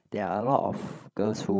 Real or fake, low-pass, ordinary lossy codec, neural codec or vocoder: fake; none; none; codec, 16 kHz, 16 kbps, FreqCodec, larger model